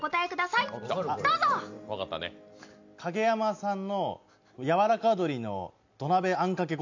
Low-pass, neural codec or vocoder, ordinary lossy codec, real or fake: 7.2 kHz; none; MP3, 64 kbps; real